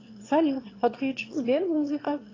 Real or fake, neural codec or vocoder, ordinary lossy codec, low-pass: fake; autoencoder, 22.05 kHz, a latent of 192 numbers a frame, VITS, trained on one speaker; AAC, 32 kbps; 7.2 kHz